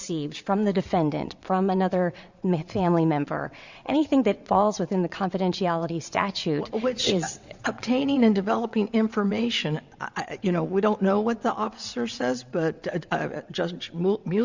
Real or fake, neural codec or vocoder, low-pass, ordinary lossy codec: fake; vocoder, 22.05 kHz, 80 mel bands, WaveNeXt; 7.2 kHz; Opus, 64 kbps